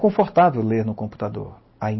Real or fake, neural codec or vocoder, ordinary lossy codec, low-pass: real; none; MP3, 24 kbps; 7.2 kHz